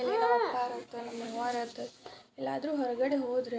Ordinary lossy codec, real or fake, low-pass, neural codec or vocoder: none; real; none; none